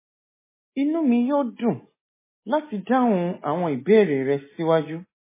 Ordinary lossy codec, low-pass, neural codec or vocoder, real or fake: MP3, 16 kbps; 3.6 kHz; none; real